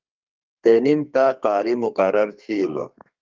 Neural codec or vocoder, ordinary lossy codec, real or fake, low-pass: codec, 32 kHz, 1.9 kbps, SNAC; Opus, 32 kbps; fake; 7.2 kHz